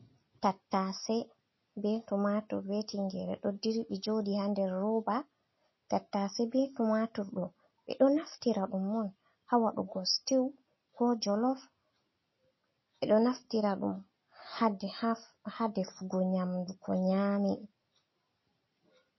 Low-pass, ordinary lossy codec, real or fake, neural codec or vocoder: 7.2 kHz; MP3, 24 kbps; real; none